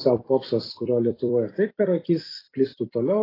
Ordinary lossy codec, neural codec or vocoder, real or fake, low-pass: AAC, 24 kbps; vocoder, 44.1 kHz, 128 mel bands every 256 samples, BigVGAN v2; fake; 5.4 kHz